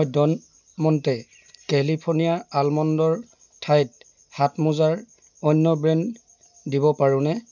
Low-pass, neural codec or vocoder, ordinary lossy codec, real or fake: 7.2 kHz; none; none; real